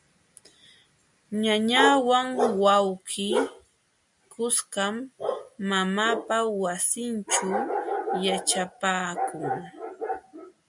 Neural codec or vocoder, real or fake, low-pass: none; real; 10.8 kHz